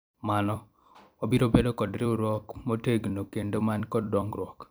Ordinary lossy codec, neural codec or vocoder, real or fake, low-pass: none; vocoder, 44.1 kHz, 128 mel bands every 256 samples, BigVGAN v2; fake; none